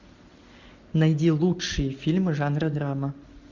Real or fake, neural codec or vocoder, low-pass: real; none; 7.2 kHz